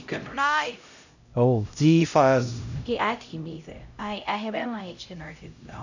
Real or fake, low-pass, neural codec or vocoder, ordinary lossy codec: fake; 7.2 kHz; codec, 16 kHz, 0.5 kbps, X-Codec, HuBERT features, trained on LibriSpeech; none